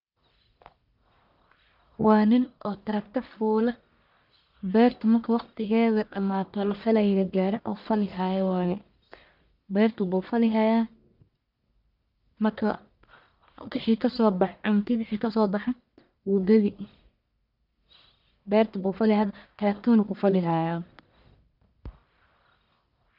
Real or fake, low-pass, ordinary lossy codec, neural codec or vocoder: fake; 5.4 kHz; none; codec, 44.1 kHz, 1.7 kbps, Pupu-Codec